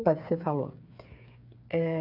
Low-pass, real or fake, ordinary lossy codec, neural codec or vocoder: 5.4 kHz; fake; none; codec, 16 kHz, 8 kbps, FreqCodec, smaller model